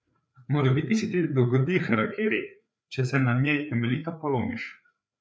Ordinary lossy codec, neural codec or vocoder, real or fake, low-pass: none; codec, 16 kHz, 4 kbps, FreqCodec, larger model; fake; none